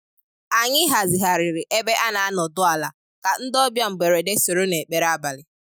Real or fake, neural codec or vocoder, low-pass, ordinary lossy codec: real; none; none; none